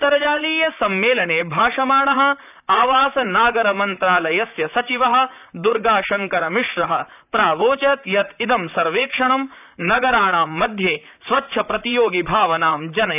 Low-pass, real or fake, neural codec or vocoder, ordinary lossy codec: 3.6 kHz; fake; vocoder, 44.1 kHz, 128 mel bands, Pupu-Vocoder; none